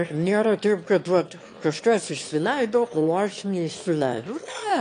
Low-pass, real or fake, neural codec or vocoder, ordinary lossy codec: 9.9 kHz; fake; autoencoder, 22.05 kHz, a latent of 192 numbers a frame, VITS, trained on one speaker; AAC, 48 kbps